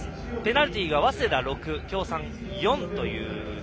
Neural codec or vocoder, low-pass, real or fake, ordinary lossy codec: none; none; real; none